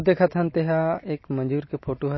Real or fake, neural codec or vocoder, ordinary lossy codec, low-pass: real; none; MP3, 24 kbps; 7.2 kHz